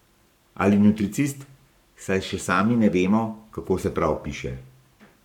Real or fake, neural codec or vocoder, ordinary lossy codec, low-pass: fake; codec, 44.1 kHz, 7.8 kbps, Pupu-Codec; none; 19.8 kHz